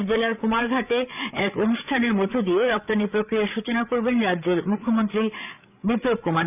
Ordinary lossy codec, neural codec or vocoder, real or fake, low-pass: none; codec, 16 kHz, 8 kbps, FreqCodec, larger model; fake; 3.6 kHz